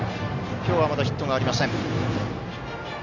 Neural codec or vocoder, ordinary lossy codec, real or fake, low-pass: none; none; real; 7.2 kHz